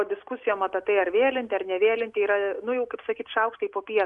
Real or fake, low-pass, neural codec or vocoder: real; 10.8 kHz; none